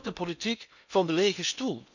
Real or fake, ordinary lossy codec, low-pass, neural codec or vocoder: fake; none; 7.2 kHz; codec, 16 kHz in and 24 kHz out, 0.8 kbps, FocalCodec, streaming, 65536 codes